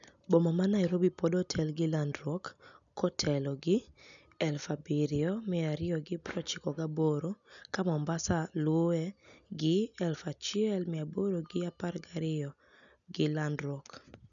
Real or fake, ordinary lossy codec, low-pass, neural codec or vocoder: real; none; 7.2 kHz; none